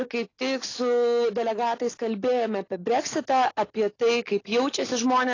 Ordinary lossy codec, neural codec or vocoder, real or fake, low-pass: AAC, 32 kbps; none; real; 7.2 kHz